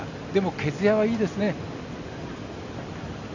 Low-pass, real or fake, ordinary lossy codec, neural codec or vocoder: 7.2 kHz; real; none; none